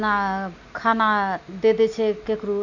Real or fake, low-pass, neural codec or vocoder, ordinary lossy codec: real; 7.2 kHz; none; none